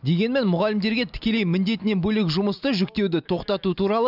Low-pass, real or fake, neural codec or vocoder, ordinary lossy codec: 5.4 kHz; real; none; none